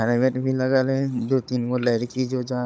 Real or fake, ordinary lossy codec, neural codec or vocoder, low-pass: fake; none; codec, 16 kHz, 16 kbps, FunCodec, trained on Chinese and English, 50 frames a second; none